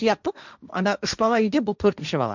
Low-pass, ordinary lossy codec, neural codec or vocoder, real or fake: 7.2 kHz; none; codec, 16 kHz, 1.1 kbps, Voila-Tokenizer; fake